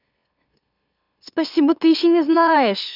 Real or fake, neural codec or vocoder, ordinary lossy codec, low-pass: fake; autoencoder, 44.1 kHz, a latent of 192 numbers a frame, MeloTTS; none; 5.4 kHz